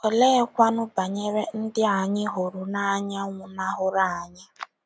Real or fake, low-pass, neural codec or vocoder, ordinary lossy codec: real; none; none; none